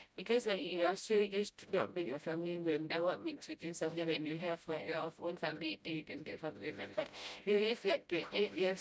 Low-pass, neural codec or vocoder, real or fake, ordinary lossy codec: none; codec, 16 kHz, 0.5 kbps, FreqCodec, smaller model; fake; none